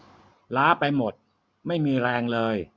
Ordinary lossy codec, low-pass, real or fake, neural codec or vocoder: none; none; real; none